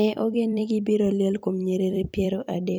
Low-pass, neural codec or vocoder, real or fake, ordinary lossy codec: none; vocoder, 44.1 kHz, 128 mel bands every 256 samples, BigVGAN v2; fake; none